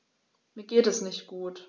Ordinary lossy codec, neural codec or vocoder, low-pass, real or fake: none; none; none; real